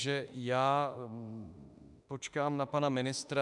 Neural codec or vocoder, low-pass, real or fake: autoencoder, 48 kHz, 32 numbers a frame, DAC-VAE, trained on Japanese speech; 10.8 kHz; fake